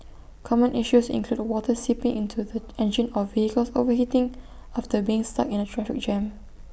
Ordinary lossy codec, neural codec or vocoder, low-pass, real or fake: none; none; none; real